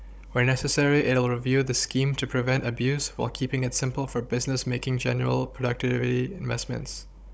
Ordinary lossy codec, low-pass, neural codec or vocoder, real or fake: none; none; codec, 16 kHz, 16 kbps, FunCodec, trained on Chinese and English, 50 frames a second; fake